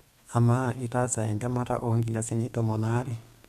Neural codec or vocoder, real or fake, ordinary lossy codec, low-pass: codec, 32 kHz, 1.9 kbps, SNAC; fake; none; 14.4 kHz